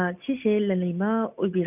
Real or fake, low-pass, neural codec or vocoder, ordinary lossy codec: real; 3.6 kHz; none; none